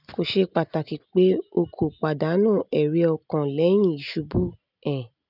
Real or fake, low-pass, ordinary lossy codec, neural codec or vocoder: real; 5.4 kHz; none; none